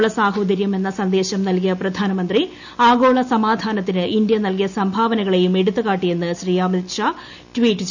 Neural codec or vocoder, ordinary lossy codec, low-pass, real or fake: none; none; 7.2 kHz; real